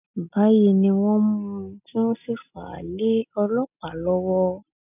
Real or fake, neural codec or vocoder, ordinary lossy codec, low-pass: real; none; none; 3.6 kHz